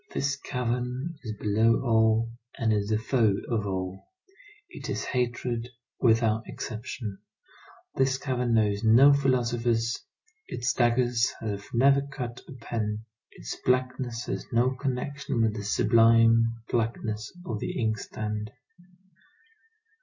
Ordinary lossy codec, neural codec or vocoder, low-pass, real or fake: MP3, 48 kbps; none; 7.2 kHz; real